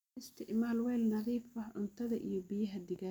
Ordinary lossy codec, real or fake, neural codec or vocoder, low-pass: none; real; none; 19.8 kHz